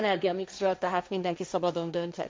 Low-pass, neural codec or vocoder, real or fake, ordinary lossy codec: none; codec, 16 kHz, 1.1 kbps, Voila-Tokenizer; fake; none